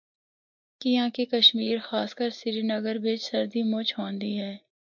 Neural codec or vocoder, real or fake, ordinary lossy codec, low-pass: none; real; MP3, 64 kbps; 7.2 kHz